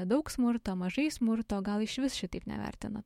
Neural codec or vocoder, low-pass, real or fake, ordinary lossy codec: autoencoder, 48 kHz, 128 numbers a frame, DAC-VAE, trained on Japanese speech; 19.8 kHz; fake; MP3, 64 kbps